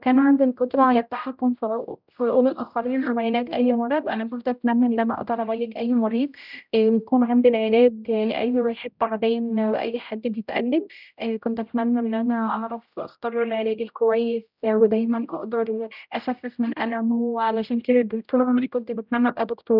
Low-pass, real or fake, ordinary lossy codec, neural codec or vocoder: 5.4 kHz; fake; none; codec, 16 kHz, 0.5 kbps, X-Codec, HuBERT features, trained on general audio